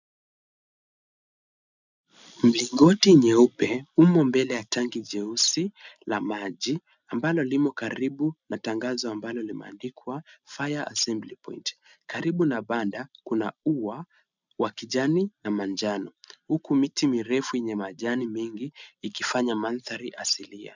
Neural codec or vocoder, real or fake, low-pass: none; real; 7.2 kHz